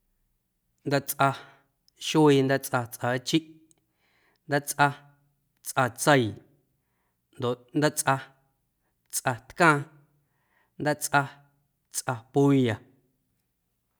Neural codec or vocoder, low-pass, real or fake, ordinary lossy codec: none; none; real; none